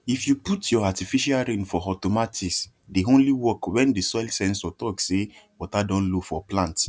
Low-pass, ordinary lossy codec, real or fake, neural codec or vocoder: none; none; real; none